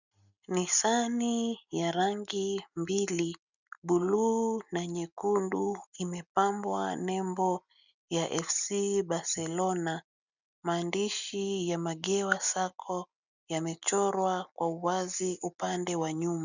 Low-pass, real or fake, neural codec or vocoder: 7.2 kHz; real; none